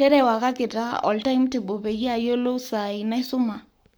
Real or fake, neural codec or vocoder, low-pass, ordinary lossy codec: fake; codec, 44.1 kHz, 7.8 kbps, Pupu-Codec; none; none